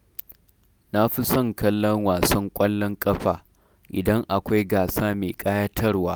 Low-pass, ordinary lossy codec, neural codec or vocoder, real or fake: none; none; none; real